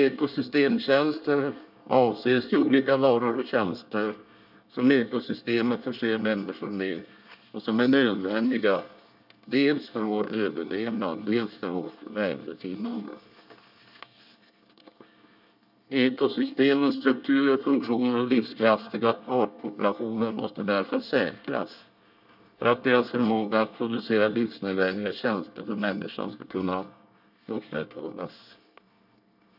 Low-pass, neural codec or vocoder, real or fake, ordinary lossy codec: 5.4 kHz; codec, 24 kHz, 1 kbps, SNAC; fake; none